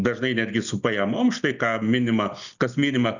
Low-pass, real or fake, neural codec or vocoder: 7.2 kHz; real; none